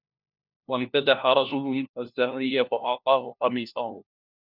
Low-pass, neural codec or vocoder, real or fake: 5.4 kHz; codec, 16 kHz, 1 kbps, FunCodec, trained on LibriTTS, 50 frames a second; fake